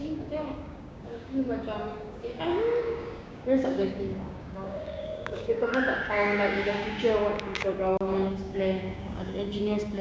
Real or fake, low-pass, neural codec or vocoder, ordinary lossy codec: fake; none; codec, 16 kHz, 6 kbps, DAC; none